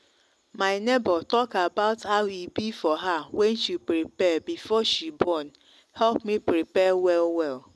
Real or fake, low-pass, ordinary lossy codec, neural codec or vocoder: real; none; none; none